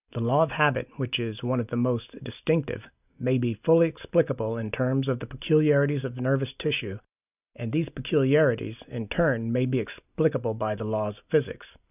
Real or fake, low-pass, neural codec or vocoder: real; 3.6 kHz; none